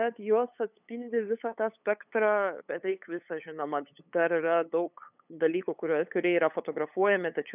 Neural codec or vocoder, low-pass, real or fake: codec, 16 kHz, 8 kbps, FunCodec, trained on LibriTTS, 25 frames a second; 3.6 kHz; fake